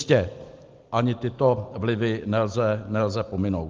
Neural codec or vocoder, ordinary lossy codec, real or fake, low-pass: none; Opus, 32 kbps; real; 7.2 kHz